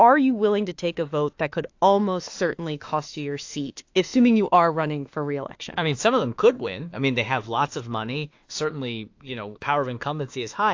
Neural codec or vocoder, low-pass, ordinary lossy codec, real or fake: autoencoder, 48 kHz, 32 numbers a frame, DAC-VAE, trained on Japanese speech; 7.2 kHz; AAC, 48 kbps; fake